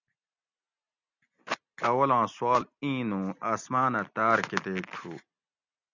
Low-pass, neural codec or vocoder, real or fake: 7.2 kHz; none; real